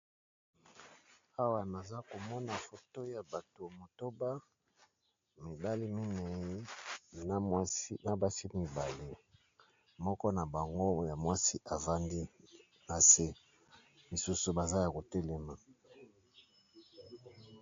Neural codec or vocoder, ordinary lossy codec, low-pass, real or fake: none; AAC, 48 kbps; 7.2 kHz; real